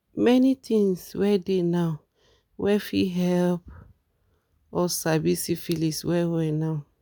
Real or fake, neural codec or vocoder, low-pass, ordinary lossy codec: real; none; none; none